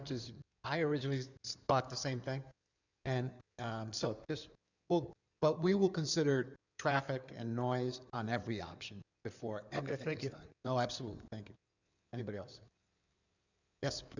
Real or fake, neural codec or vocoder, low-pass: fake; codec, 16 kHz in and 24 kHz out, 2.2 kbps, FireRedTTS-2 codec; 7.2 kHz